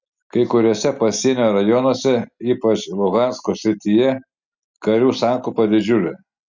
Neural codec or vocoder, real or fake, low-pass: none; real; 7.2 kHz